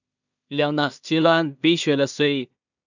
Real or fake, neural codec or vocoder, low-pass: fake; codec, 16 kHz in and 24 kHz out, 0.4 kbps, LongCat-Audio-Codec, two codebook decoder; 7.2 kHz